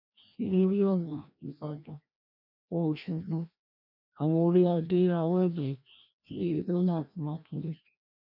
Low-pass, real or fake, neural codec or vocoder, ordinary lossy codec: 5.4 kHz; fake; codec, 16 kHz, 1 kbps, FreqCodec, larger model; none